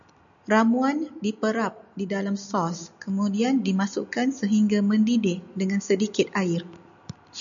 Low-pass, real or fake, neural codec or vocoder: 7.2 kHz; real; none